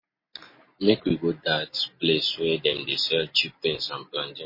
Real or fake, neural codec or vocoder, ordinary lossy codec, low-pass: real; none; MP3, 24 kbps; 5.4 kHz